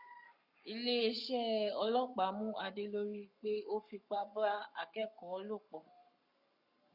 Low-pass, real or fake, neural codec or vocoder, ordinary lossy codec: 5.4 kHz; fake; codec, 44.1 kHz, 7.8 kbps, Pupu-Codec; Opus, 64 kbps